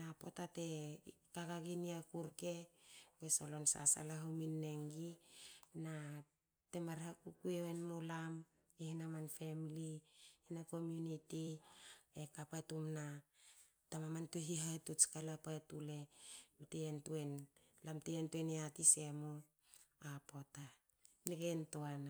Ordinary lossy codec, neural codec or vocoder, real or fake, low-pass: none; vocoder, 44.1 kHz, 128 mel bands every 256 samples, BigVGAN v2; fake; none